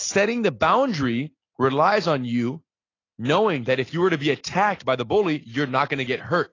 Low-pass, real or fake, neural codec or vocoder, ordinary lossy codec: 7.2 kHz; real; none; AAC, 32 kbps